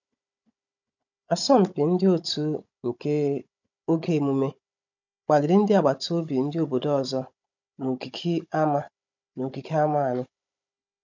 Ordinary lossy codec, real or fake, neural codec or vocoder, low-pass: none; fake; codec, 16 kHz, 16 kbps, FunCodec, trained on Chinese and English, 50 frames a second; 7.2 kHz